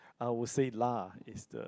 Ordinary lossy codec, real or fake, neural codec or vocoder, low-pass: none; real; none; none